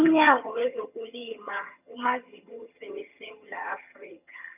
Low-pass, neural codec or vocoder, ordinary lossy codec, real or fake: 3.6 kHz; vocoder, 22.05 kHz, 80 mel bands, HiFi-GAN; none; fake